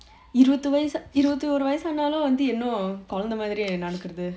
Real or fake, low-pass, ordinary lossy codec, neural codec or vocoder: real; none; none; none